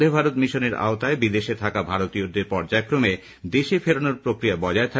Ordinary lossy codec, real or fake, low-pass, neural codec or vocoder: none; real; none; none